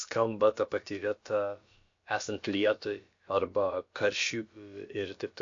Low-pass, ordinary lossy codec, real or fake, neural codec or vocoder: 7.2 kHz; MP3, 48 kbps; fake; codec, 16 kHz, about 1 kbps, DyCAST, with the encoder's durations